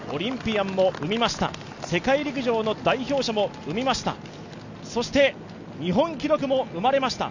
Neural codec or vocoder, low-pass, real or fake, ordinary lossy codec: none; 7.2 kHz; real; none